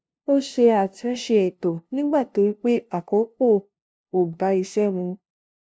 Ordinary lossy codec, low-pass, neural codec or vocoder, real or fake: none; none; codec, 16 kHz, 0.5 kbps, FunCodec, trained on LibriTTS, 25 frames a second; fake